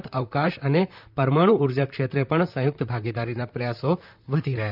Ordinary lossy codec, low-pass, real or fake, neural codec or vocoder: none; 5.4 kHz; fake; vocoder, 44.1 kHz, 128 mel bands, Pupu-Vocoder